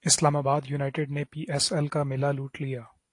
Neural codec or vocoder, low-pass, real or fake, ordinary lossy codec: none; 10.8 kHz; real; AAC, 48 kbps